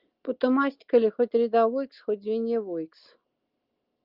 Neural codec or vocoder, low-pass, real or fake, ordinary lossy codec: none; 5.4 kHz; real; Opus, 32 kbps